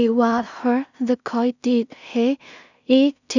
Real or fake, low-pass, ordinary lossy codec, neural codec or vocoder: fake; 7.2 kHz; none; codec, 16 kHz in and 24 kHz out, 0.4 kbps, LongCat-Audio-Codec, two codebook decoder